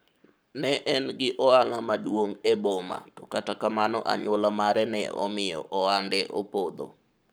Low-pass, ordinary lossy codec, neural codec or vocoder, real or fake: none; none; codec, 44.1 kHz, 7.8 kbps, Pupu-Codec; fake